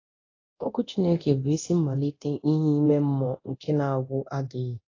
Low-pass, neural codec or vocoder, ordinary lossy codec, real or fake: 7.2 kHz; codec, 24 kHz, 0.9 kbps, DualCodec; AAC, 32 kbps; fake